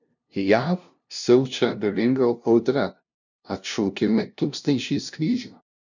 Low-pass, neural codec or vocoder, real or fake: 7.2 kHz; codec, 16 kHz, 0.5 kbps, FunCodec, trained on LibriTTS, 25 frames a second; fake